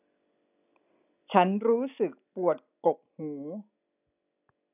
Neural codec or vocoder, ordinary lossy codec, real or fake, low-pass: none; none; real; 3.6 kHz